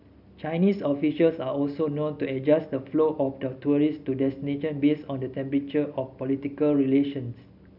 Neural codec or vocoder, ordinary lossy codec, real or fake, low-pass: none; none; real; 5.4 kHz